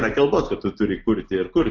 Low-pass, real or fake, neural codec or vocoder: 7.2 kHz; real; none